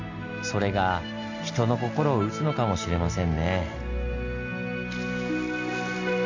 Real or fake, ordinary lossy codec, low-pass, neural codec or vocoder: real; MP3, 48 kbps; 7.2 kHz; none